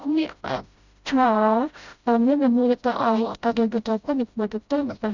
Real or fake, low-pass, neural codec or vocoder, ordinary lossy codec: fake; 7.2 kHz; codec, 16 kHz, 0.5 kbps, FreqCodec, smaller model; Opus, 64 kbps